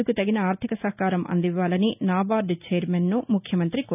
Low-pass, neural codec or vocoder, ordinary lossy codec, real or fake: 3.6 kHz; none; none; real